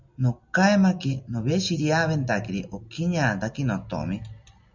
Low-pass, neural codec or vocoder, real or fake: 7.2 kHz; none; real